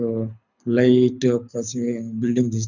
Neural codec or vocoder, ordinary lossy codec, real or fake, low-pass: codec, 24 kHz, 6 kbps, HILCodec; none; fake; 7.2 kHz